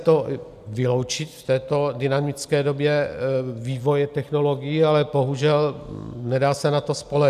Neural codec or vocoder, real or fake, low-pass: none; real; 14.4 kHz